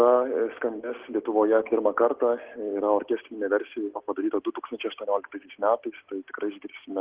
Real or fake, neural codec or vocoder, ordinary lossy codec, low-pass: real; none; Opus, 16 kbps; 3.6 kHz